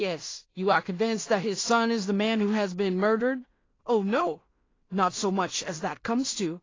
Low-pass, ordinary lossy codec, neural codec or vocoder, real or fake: 7.2 kHz; AAC, 32 kbps; codec, 16 kHz in and 24 kHz out, 0.4 kbps, LongCat-Audio-Codec, two codebook decoder; fake